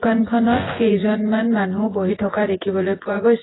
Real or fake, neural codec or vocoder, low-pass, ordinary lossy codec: fake; vocoder, 24 kHz, 100 mel bands, Vocos; 7.2 kHz; AAC, 16 kbps